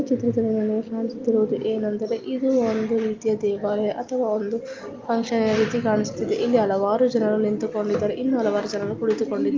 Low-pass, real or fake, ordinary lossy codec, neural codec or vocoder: 7.2 kHz; real; Opus, 24 kbps; none